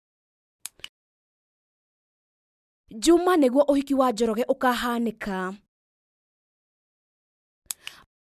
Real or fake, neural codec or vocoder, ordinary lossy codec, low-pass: real; none; none; 14.4 kHz